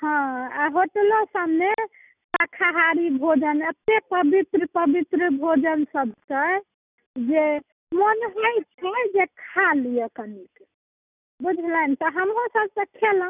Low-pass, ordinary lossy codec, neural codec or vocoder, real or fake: 3.6 kHz; none; none; real